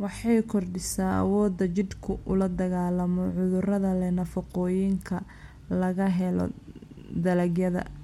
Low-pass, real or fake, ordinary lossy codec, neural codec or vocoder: 19.8 kHz; real; MP3, 64 kbps; none